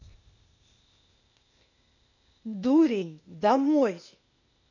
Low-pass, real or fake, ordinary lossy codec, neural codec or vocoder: 7.2 kHz; fake; none; codec, 16 kHz, 0.8 kbps, ZipCodec